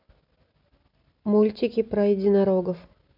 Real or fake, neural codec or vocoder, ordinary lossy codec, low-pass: real; none; none; 5.4 kHz